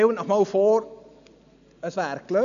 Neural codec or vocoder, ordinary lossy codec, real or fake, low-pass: none; none; real; 7.2 kHz